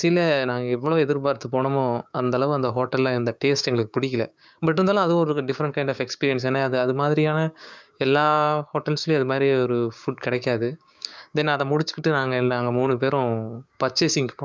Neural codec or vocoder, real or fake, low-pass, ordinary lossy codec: codec, 16 kHz, 6 kbps, DAC; fake; none; none